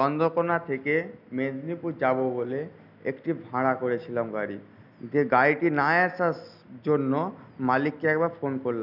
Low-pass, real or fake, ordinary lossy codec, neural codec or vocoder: 5.4 kHz; real; none; none